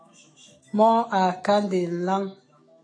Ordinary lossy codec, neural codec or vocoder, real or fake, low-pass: AAC, 32 kbps; autoencoder, 48 kHz, 128 numbers a frame, DAC-VAE, trained on Japanese speech; fake; 9.9 kHz